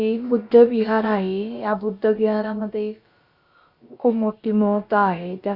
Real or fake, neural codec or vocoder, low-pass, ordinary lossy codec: fake; codec, 16 kHz, about 1 kbps, DyCAST, with the encoder's durations; 5.4 kHz; Opus, 64 kbps